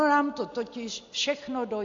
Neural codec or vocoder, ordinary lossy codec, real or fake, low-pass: none; AAC, 48 kbps; real; 7.2 kHz